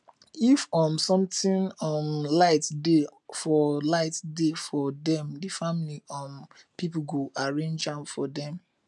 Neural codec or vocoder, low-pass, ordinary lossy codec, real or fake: none; 10.8 kHz; none; real